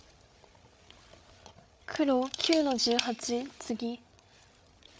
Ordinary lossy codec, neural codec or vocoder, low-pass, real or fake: none; codec, 16 kHz, 8 kbps, FreqCodec, larger model; none; fake